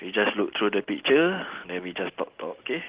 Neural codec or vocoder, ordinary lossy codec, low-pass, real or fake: none; Opus, 16 kbps; 3.6 kHz; real